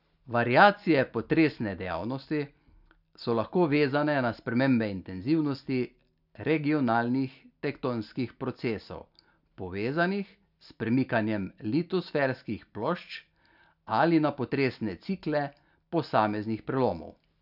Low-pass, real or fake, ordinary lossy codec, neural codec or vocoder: 5.4 kHz; real; none; none